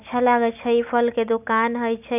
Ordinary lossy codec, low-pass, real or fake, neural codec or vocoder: none; 3.6 kHz; real; none